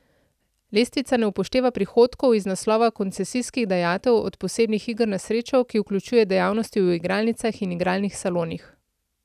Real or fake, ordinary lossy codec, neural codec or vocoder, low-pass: real; none; none; 14.4 kHz